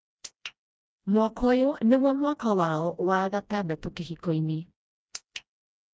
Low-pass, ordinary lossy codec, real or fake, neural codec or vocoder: none; none; fake; codec, 16 kHz, 1 kbps, FreqCodec, smaller model